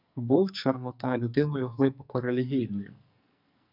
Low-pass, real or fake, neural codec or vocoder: 5.4 kHz; fake; codec, 44.1 kHz, 2.6 kbps, SNAC